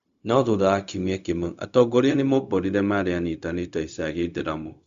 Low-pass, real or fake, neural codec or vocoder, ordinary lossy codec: 7.2 kHz; fake; codec, 16 kHz, 0.4 kbps, LongCat-Audio-Codec; Opus, 64 kbps